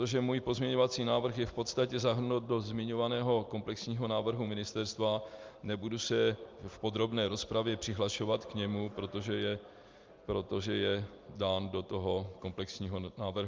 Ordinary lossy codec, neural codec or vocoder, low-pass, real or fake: Opus, 24 kbps; none; 7.2 kHz; real